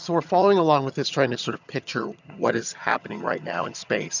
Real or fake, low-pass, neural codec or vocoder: fake; 7.2 kHz; vocoder, 22.05 kHz, 80 mel bands, HiFi-GAN